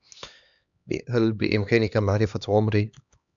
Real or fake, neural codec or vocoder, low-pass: fake; codec, 16 kHz, 2 kbps, X-Codec, HuBERT features, trained on LibriSpeech; 7.2 kHz